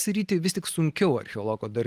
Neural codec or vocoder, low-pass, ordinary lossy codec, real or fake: none; 14.4 kHz; Opus, 24 kbps; real